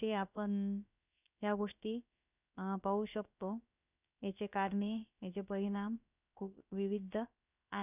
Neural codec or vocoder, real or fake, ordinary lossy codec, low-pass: codec, 16 kHz, about 1 kbps, DyCAST, with the encoder's durations; fake; none; 3.6 kHz